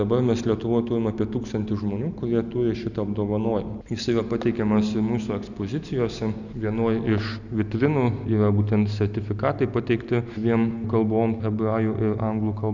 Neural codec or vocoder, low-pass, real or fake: none; 7.2 kHz; real